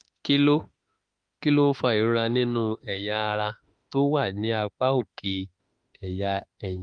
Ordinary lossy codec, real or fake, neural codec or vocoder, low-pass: Opus, 32 kbps; fake; autoencoder, 48 kHz, 32 numbers a frame, DAC-VAE, trained on Japanese speech; 9.9 kHz